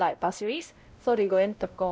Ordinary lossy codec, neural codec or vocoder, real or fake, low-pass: none; codec, 16 kHz, 0.5 kbps, X-Codec, WavLM features, trained on Multilingual LibriSpeech; fake; none